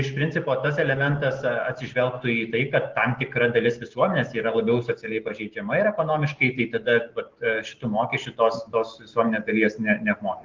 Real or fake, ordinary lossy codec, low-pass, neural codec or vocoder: real; Opus, 16 kbps; 7.2 kHz; none